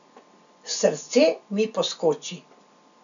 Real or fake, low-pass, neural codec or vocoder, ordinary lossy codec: real; 7.2 kHz; none; none